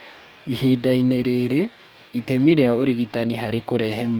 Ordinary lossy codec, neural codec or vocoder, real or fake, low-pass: none; codec, 44.1 kHz, 2.6 kbps, DAC; fake; none